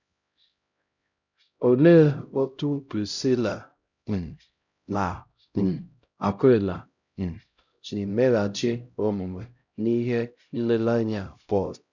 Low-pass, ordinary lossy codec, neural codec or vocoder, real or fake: 7.2 kHz; none; codec, 16 kHz, 0.5 kbps, X-Codec, HuBERT features, trained on LibriSpeech; fake